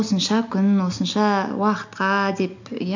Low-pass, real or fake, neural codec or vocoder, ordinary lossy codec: 7.2 kHz; real; none; none